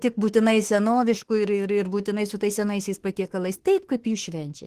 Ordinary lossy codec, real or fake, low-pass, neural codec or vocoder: Opus, 16 kbps; fake; 14.4 kHz; autoencoder, 48 kHz, 32 numbers a frame, DAC-VAE, trained on Japanese speech